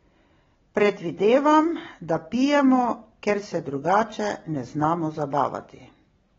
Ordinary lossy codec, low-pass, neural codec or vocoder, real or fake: AAC, 24 kbps; 7.2 kHz; none; real